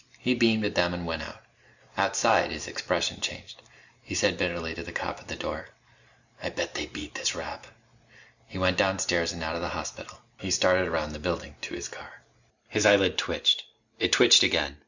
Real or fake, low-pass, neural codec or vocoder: real; 7.2 kHz; none